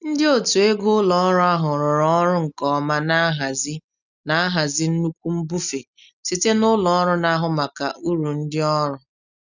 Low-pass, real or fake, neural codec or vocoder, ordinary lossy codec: 7.2 kHz; real; none; none